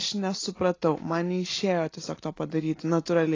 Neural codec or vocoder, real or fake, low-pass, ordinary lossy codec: none; real; 7.2 kHz; AAC, 32 kbps